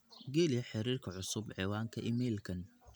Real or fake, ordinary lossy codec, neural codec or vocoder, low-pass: real; none; none; none